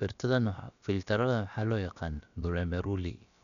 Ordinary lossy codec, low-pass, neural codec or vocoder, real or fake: none; 7.2 kHz; codec, 16 kHz, about 1 kbps, DyCAST, with the encoder's durations; fake